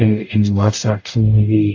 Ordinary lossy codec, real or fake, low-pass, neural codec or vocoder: AAC, 48 kbps; fake; 7.2 kHz; codec, 44.1 kHz, 0.9 kbps, DAC